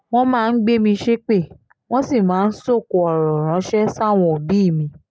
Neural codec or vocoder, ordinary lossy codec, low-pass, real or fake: none; none; none; real